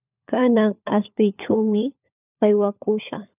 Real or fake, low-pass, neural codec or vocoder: fake; 3.6 kHz; codec, 16 kHz, 4 kbps, FunCodec, trained on LibriTTS, 50 frames a second